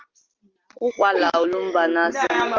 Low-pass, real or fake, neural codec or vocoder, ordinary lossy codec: 7.2 kHz; real; none; Opus, 32 kbps